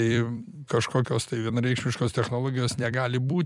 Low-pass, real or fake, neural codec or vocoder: 10.8 kHz; fake; vocoder, 44.1 kHz, 128 mel bands every 256 samples, BigVGAN v2